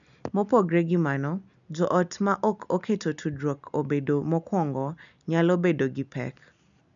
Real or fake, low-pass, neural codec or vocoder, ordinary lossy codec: real; 7.2 kHz; none; none